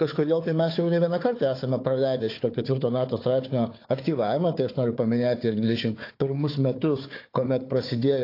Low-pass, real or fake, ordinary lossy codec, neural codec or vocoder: 5.4 kHz; fake; AAC, 32 kbps; codec, 16 kHz, 4 kbps, FunCodec, trained on Chinese and English, 50 frames a second